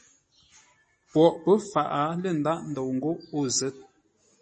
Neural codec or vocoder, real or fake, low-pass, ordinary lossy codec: none; real; 10.8 kHz; MP3, 32 kbps